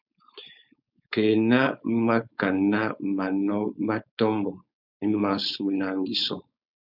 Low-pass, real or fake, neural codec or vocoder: 5.4 kHz; fake; codec, 16 kHz, 4.8 kbps, FACodec